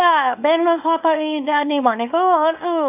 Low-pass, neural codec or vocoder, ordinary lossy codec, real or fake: 3.6 kHz; codec, 24 kHz, 0.9 kbps, WavTokenizer, small release; none; fake